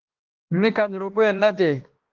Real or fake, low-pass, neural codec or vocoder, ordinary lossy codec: fake; 7.2 kHz; codec, 16 kHz, 1 kbps, X-Codec, HuBERT features, trained on balanced general audio; Opus, 16 kbps